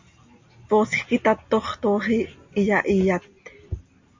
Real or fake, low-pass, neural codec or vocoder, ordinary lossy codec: real; 7.2 kHz; none; MP3, 48 kbps